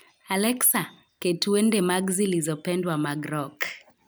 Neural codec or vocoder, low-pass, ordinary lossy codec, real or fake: none; none; none; real